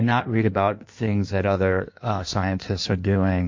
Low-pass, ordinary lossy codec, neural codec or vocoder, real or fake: 7.2 kHz; MP3, 48 kbps; codec, 16 kHz in and 24 kHz out, 1.1 kbps, FireRedTTS-2 codec; fake